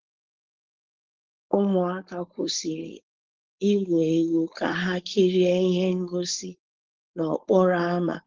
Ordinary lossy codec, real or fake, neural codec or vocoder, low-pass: Opus, 16 kbps; fake; codec, 16 kHz, 4.8 kbps, FACodec; 7.2 kHz